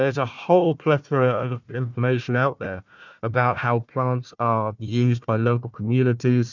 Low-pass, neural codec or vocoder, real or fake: 7.2 kHz; codec, 16 kHz, 1 kbps, FunCodec, trained on Chinese and English, 50 frames a second; fake